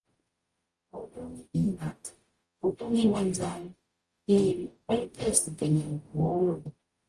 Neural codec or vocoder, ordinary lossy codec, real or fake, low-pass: codec, 44.1 kHz, 0.9 kbps, DAC; Opus, 32 kbps; fake; 10.8 kHz